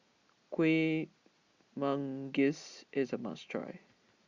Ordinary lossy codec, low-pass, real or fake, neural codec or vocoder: Opus, 64 kbps; 7.2 kHz; real; none